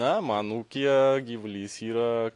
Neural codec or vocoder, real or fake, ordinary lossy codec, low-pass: none; real; AAC, 48 kbps; 10.8 kHz